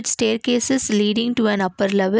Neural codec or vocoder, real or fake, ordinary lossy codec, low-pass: none; real; none; none